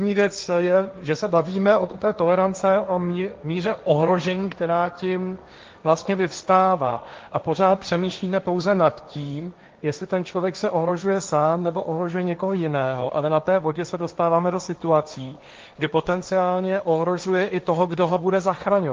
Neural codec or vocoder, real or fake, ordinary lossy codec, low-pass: codec, 16 kHz, 1.1 kbps, Voila-Tokenizer; fake; Opus, 24 kbps; 7.2 kHz